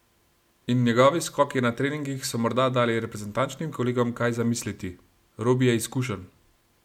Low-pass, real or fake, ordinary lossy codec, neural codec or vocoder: 19.8 kHz; real; MP3, 96 kbps; none